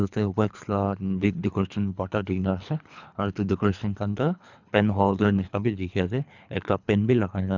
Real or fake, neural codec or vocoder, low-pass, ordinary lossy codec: fake; codec, 24 kHz, 3 kbps, HILCodec; 7.2 kHz; none